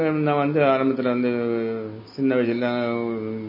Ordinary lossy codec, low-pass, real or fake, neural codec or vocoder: MP3, 24 kbps; 5.4 kHz; real; none